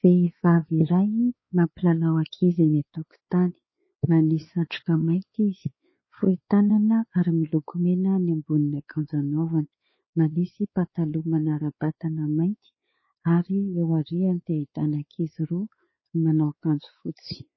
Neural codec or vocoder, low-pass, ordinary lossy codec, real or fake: codec, 16 kHz, 4 kbps, X-Codec, WavLM features, trained on Multilingual LibriSpeech; 7.2 kHz; MP3, 24 kbps; fake